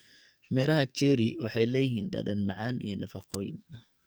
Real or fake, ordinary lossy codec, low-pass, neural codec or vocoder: fake; none; none; codec, 44.1 kHz, 2.6 kbps, SNAC